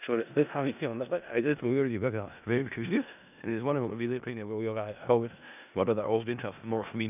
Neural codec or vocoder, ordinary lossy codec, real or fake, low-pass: codec, 16 kHz in and 24 kHz out, 0.4 kbps, LongCat-Audio-Codec, four codebook decoder; none; fake; 3.6 kHz